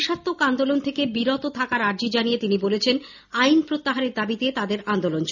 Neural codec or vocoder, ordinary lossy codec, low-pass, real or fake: none; none; 7.2 kHz; real